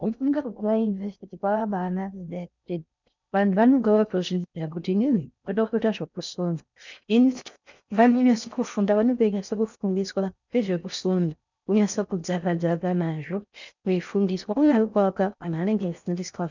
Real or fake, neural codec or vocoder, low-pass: fake; codec, 16 kHz in and 24 kHz out, 0.6 kbps, FocalCodec, streaming, 4096 codes; 7.2 kHz